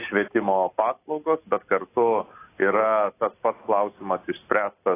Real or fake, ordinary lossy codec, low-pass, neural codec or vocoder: real; AAC, 24 kbps; 3.6 kHz; none